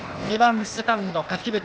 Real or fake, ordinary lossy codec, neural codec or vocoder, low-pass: fake; none; codec, 16 kHz, 0.8 kbps, ZipCodec; none